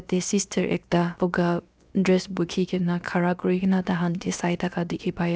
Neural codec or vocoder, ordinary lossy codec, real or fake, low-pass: codec, 16 kHz, 0.7 kbps, FocalCodec; none; fake; none